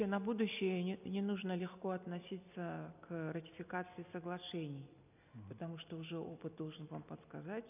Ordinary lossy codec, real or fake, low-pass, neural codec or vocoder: none; real; 3.6 kHz; none